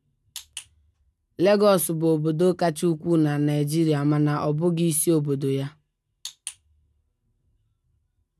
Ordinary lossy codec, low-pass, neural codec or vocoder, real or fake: none; none; none; real